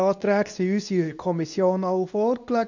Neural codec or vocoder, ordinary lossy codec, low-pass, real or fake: codec, 24 kHz, 0.9 kbps, WavTokenizer, medium speech release version 2; none; 7.2 kHz; fake